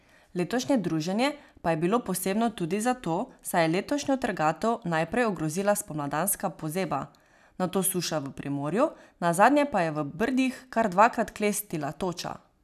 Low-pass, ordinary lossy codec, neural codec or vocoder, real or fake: 14.4 kHz; none; none; real